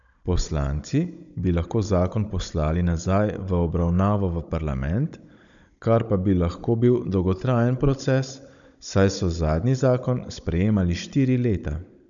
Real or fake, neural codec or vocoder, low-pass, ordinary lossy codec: fake; codec, 16 kHz, 16 kbps, FunCodec, trained on Chinese and English, 50 frames a second; 7.2 kHz; none